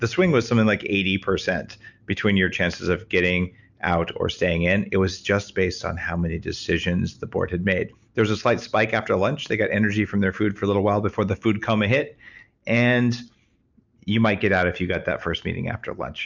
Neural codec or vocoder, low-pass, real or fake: none; 7.2 kHz; real